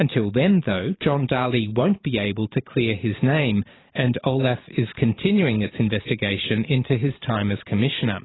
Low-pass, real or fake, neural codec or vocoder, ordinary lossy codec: 7.2 kHz; fake; vocoder, 44.1 kHz, 128 mel bands every 512 samples, BigVGAN v2; AAC, 16 kbps